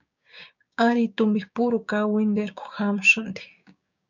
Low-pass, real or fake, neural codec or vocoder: 7.2 kHz; fake; codec, 16 kHz, 6 kbps, DAC